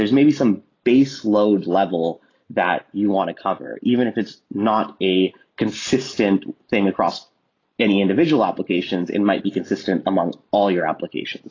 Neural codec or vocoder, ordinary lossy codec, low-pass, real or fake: none; AAC, 32 kbps; 7.2 kHz; real